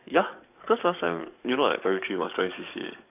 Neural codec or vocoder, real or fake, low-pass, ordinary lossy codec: codec, 44.1 kHz, 7.8 kbps, DAC; fake; 3.6 kHz; none